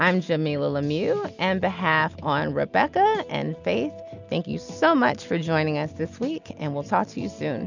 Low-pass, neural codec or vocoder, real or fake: 7.2 kHz; none; real